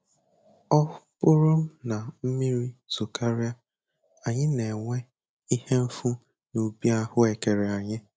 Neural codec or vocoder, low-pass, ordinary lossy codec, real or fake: none; none; none; real